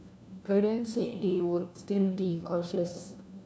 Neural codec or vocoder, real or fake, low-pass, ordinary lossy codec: codec, 16 kHz, 1 kbps, FunCodec, trained on LibriTTS, 50 frames a second; fake; none; none